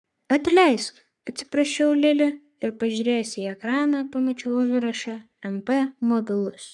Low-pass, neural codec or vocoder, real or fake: 10.8 kHz; codec, 44.1 kHz, 3.4 kbps, Pupu-Codec; fake